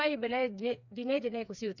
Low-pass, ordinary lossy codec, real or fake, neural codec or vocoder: 7.2 kHz; none; fake; codec, 16 kHz, 4 kbps, FreqCodec, smaller model